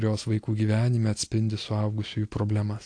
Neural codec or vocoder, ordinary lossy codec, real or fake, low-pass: none; AAC, 48 kbps; real; 9.9 kHz